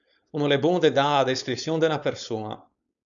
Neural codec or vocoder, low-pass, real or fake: codec, 16 kHz, 4.8 kbps, FACodec; 7.2 kHz; fake